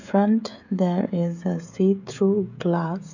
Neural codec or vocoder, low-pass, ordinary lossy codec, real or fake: codec, 16 kHz, 16 kbps, FreqCodec, smaller model; 7.2 kHz; none; fake